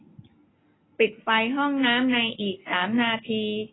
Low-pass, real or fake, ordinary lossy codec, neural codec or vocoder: 7.2 kHz; real; AAC, 16 kbps; none